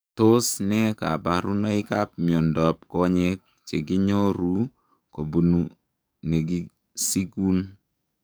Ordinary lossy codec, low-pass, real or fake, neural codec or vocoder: none; none; fake; codec, 44.1 kHz, 7.8 kbps, DAC